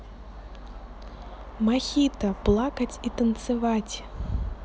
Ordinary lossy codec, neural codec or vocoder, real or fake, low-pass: none; none; real; none